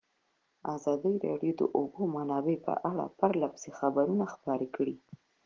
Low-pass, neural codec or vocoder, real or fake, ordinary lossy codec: 7.2 kHz; none; real; Opus, 16 kbps